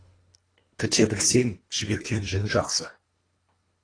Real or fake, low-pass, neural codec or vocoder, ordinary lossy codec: fake; 9.9 kHz; codec, 24 kHz, 1.5 kbps, HILCodec; AAC, 32 kbps